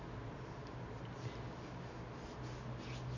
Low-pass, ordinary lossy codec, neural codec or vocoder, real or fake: 7.2 kHz; none; none; real